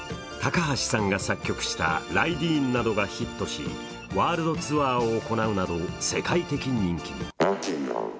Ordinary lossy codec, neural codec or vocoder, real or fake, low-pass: none; none; real; none